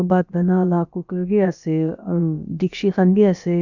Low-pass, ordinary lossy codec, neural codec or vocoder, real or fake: 7.2 kHz; none; codec, 16 kHz, about 1 kbps, DyCAST, with the encoder's durations; fake